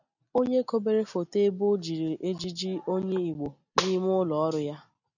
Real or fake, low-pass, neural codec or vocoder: real; 7.2 kHz; none